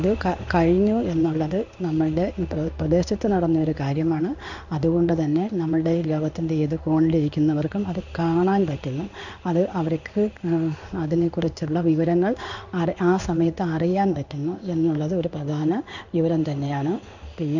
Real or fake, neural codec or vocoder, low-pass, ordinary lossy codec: fake; codec, 16 kHz in and 24 kHz out, 1 kbps, XY-Tokenizer; 7.2 kHz; none